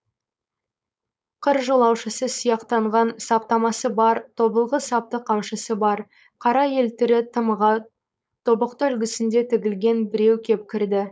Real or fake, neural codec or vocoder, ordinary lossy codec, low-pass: fake; codec, 16 kHz, 4.8 kbps, FACodec; none; none